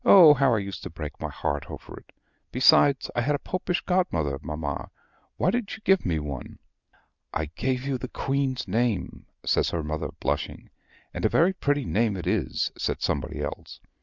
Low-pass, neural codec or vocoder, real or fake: 7.2 kHz; none; real